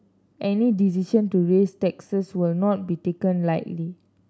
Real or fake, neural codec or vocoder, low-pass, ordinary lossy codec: real; none; none; none